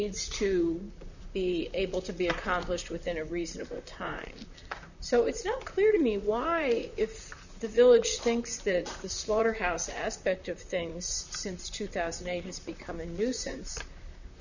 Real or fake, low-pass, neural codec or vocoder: fake; 7.2 kHz; vocoder, 44.1 kHz, 128 mel bands, Pupu-Vocoder